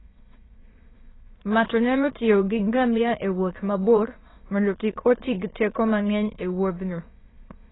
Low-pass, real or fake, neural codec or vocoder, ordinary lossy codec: 7.2 kHz; fake; autoencoder, 22.05 kHz, a latent of 192 numbers a frame, VITS, trained on many speakers; AAC, 16 kbps